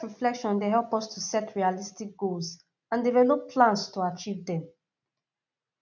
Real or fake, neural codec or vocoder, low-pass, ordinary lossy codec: real; none; 7.2 kHz; none